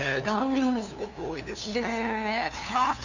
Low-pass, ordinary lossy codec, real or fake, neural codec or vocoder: 7.2 kHz; none; fake; codec, 16 kHz, 2 kbps, FunCodec, trained on LibriTTS, 25 frames a second